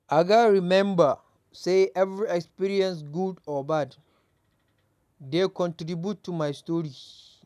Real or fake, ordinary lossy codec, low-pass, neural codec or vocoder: real; none; 14.4 kHz; none